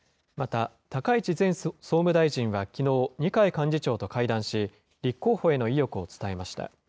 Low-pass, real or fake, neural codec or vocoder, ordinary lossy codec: none; real; none; none